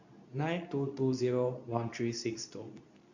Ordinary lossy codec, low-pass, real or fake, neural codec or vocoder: none; 7.2 kHz; fake; codec, 24 kHz, 0.9 kbps, WavTokenizer, medium speech release version 2